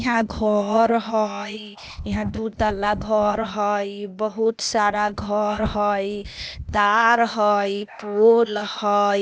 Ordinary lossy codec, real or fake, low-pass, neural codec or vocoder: none; fake; none; codec, 16 kHz, 0.8 kbps, ZipCodec